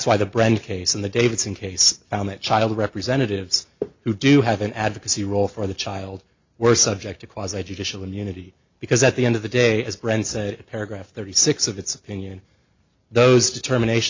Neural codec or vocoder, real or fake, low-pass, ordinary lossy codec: none; real; 7.2 kHz; MP3, 64 kbps